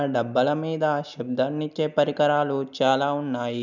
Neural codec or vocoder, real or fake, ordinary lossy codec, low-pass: none; real; none; 7.2 kHz